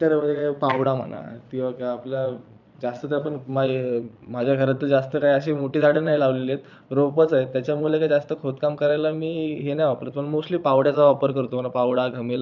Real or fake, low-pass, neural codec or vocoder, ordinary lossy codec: fake; 7.2 kHz; vocoder, 22.05 kHz, 80 mel bands, Vocos; none